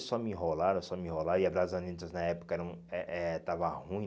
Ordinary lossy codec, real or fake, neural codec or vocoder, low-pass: none; real; none; none